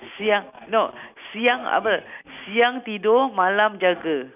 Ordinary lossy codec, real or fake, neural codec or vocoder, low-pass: none; real; none; 3.6 kHz